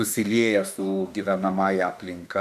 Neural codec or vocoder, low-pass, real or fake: autoencoder, 48 kHz, 32 numbers a frame, DAC-VAE, trained on Japanese speech; 14.4 kHz; fake